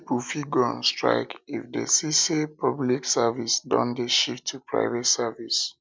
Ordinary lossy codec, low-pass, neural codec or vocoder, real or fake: none; none; none; real